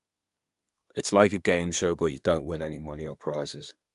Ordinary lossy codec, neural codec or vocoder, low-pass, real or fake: AAC, 96 kbps; codec, 24 kHz, 1 kbps, SNAC; 10.8 kHz; fake